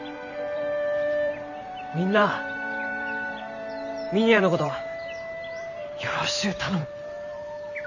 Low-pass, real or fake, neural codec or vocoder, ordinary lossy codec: 7.2 kHz; fake; vocoder, 44.1 kHz, 128 mel bands every 512 samples, BigVGAN v2; none